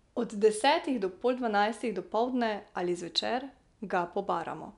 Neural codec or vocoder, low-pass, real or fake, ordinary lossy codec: none; 10.8 kHz; real; none